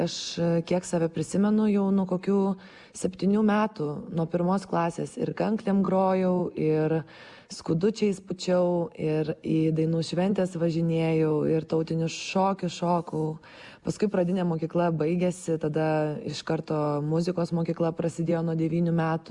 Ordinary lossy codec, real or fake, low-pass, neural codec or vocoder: Opus, 64 kbps; real; 10.8 kHz; none